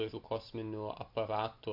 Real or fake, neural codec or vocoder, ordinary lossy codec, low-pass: real; none; MP3, 48 kbps; 5.4 kHz